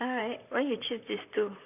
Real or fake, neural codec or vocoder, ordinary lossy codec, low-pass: fake; vocoder, 44.1 kHz, 128 mel bands, Pupu-Vocoder; none; 3.6 kHz